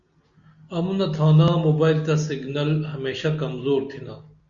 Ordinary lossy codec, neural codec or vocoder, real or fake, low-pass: Opus, 64 kbps; none; real; 7.2 kHz